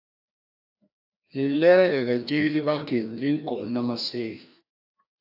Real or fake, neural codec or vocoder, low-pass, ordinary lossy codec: fake; codec, 16 kHz, 1 kbps, FreqCodec, larger model; 5.4 kHz; AAC, 48 kbps